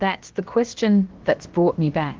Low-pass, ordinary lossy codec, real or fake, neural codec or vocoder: 7.2 kHz; Opus, 16 kbps; fake; codec, 16 kHz, about 1 kbps, DyCAST, with the encoder's durations